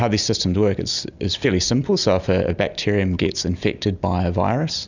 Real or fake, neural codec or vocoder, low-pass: real; none; 7.2 kHz